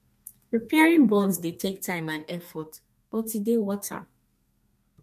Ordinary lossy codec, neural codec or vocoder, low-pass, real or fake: MP3, 64 kbps; codec, 32 kHz, 1.9 kbps, SNAC; 14.4 kHz; fake